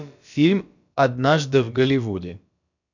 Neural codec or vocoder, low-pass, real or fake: codec, 16 kHz, about 1 kbps, DyCAST, with the encoder's durations; 7.2 kHz; fake